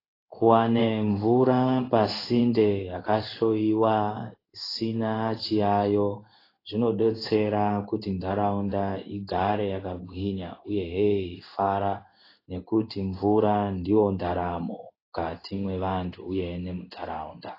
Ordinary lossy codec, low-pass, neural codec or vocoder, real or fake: AAC, 24 kbps; 5.4 kHz; codec, 16 kHz in and 24 kHz out, 1 kbps, XY-Tokenizer; fake